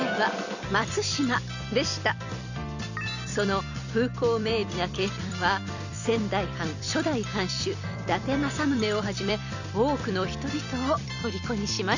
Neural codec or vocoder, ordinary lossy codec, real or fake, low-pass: vocoder, 44.1 kHz, 128 mel bands every 256 samples, BigVGAN v2; none; fake; 7.2 kHz